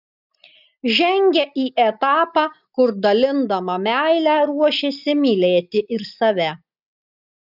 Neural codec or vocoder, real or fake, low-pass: none; real; 5.4 kHz